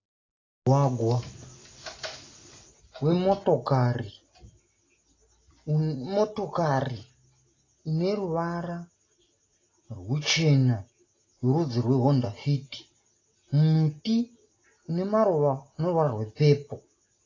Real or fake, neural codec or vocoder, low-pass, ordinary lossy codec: real; none; 7.2 kHz; AAC, 32 kbps